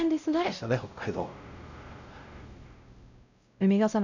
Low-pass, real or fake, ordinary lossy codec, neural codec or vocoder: 7.2 kHz; fake; none; codec, 16 kHz, 0.5 kbps, X-Codec, WavLM features, trained on Multilingual LibriSpeech